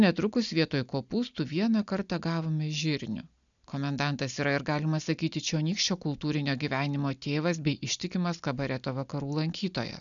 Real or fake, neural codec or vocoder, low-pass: real; none; 7.2 kHz